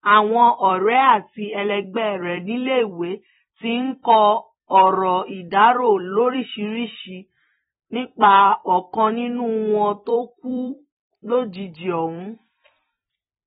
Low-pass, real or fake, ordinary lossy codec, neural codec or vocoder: 19.8 kHz; fake; AAC, 16 kbps; vocoder, 44.1 kHz, 128 mel bands every 256 samples, BigVGAN v2